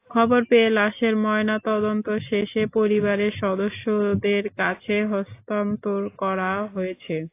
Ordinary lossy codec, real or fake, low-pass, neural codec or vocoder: AAC, 24 kbps; real; 3.6 kHz; none